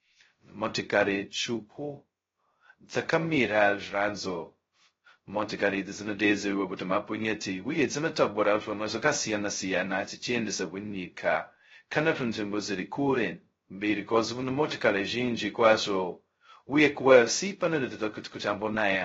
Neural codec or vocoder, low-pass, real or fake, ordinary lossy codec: codec, 16 kHz, 0.2 kbps, FocalCodec; 7.2 kHz; fake; AAC, 24 kbps